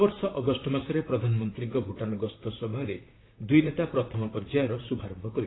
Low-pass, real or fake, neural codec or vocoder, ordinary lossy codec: 7.2 kHz; fake; vocoder, 44.1 kHz, 128 mel bands, Pupu-Vocoder; AAC, 16 kbps